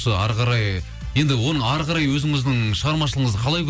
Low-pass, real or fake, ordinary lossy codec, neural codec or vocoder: none; real; none; none